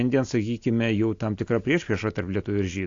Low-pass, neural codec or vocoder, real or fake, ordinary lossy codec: 7.2 kHz; none; real; AAC, 48 kbps